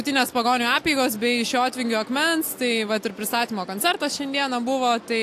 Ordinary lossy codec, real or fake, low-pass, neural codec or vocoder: AAC, 64 kbps; real; 14.4 kHz; none